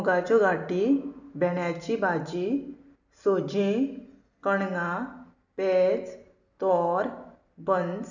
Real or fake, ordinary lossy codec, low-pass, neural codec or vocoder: real; none; 7.2 kHz; none